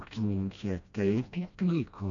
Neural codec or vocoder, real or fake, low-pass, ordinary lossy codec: codec, 16 kHz, 1 kbps, FreqCodec, smaller model; fake; 7.2 kHz; MP3, 96 kbps